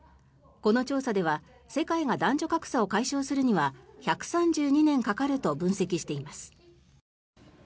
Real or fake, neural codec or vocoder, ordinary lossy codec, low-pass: real; none; none; none